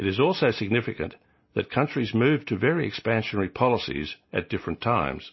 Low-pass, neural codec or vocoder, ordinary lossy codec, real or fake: 7.2 kHz; none; MP3, 24 kbps; real